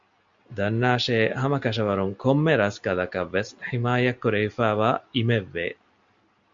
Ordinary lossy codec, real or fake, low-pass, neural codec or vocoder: MP3, 64 kbps; real; 7.2 kHz; none